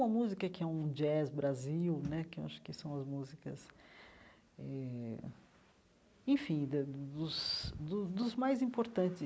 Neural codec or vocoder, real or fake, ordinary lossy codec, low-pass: none; real; none; none